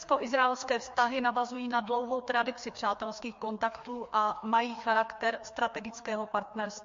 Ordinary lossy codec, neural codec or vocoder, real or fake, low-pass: MP3, 48 kbps; codec, 16 kHz, 2 kbps, FreqCodec, larger model; fake; 7.2 kHz